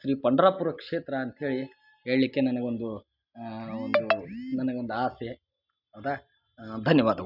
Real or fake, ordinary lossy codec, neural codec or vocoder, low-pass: real; none; none; 5.4 kHz